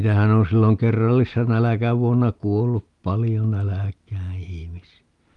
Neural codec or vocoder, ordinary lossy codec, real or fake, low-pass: none; Opus, 32 kbps; real; 10.8 kHz